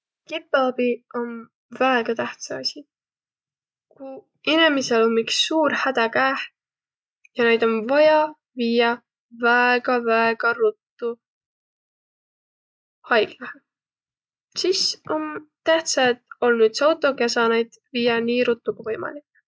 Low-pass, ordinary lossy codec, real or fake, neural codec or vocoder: none; none; real; none